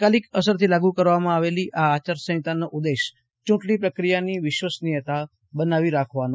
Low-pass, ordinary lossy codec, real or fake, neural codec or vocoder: none; none; real; none